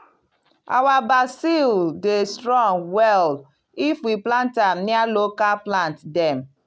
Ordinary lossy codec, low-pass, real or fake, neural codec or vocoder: none; none; real; none